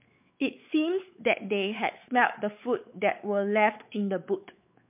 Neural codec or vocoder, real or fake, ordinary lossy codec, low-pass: codec, 16 kHz, 4 kbps, X-Codec, WavLM features, trained on Multilingual LibriSpeech; fake; MP3, 32 kbps; 3.6 kHz